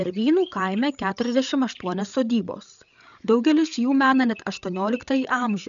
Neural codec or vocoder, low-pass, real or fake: codec, 16 kHz, 8 kbps, FreqCodec, larger model; 7.2 kHz; fake